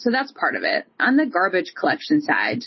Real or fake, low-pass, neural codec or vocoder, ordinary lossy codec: real; 7.2 kHz; none; MP3, 24 kbps